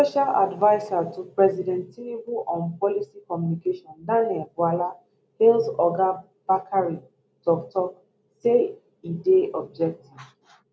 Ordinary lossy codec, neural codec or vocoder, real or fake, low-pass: none; none; real; none